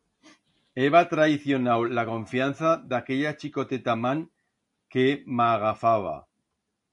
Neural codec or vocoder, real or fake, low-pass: none; real; 10.8 kHz